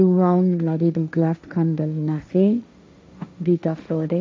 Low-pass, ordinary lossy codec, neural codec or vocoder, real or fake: none; none; codec, 16 kHz, 1.1 kbps, Voila-Tokenizer; fake